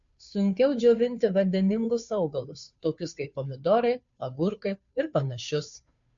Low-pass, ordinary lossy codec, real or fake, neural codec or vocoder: 7.2 kHz; MP3, 48 kbps; fake; codec, 16 kHz, 2 kbps, FunCodec, trained on Chinese and English, 25 frames a second